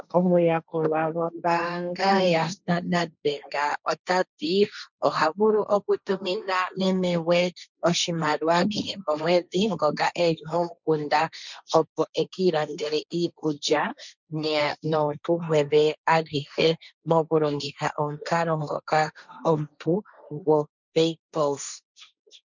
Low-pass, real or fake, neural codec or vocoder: 7.2 kHz; fake; codec, 16 kHz, 1.1 kbps, Voila-Tokenizer